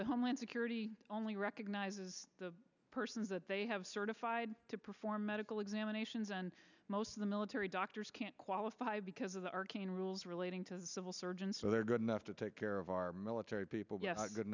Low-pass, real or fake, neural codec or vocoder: 7.2 kHz; real; none